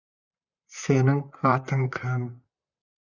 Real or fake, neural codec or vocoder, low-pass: fake; vocoder, 44.1 kHz, 128 mel bands, Pupu-Vocoder; 7.2 kHz